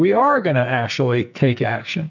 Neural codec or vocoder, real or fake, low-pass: codec, 44.1 kHz, 2.6 kbps, SNAC; fake; 7.2 kHz